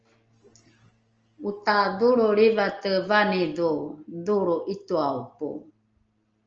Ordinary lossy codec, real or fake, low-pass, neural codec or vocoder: Opus, 24 kbps; real; 7.2 kHz; none